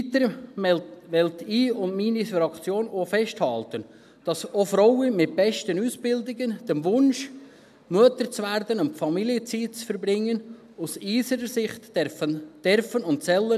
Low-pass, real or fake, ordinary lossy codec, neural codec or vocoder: 14.4 kHz; real; none; none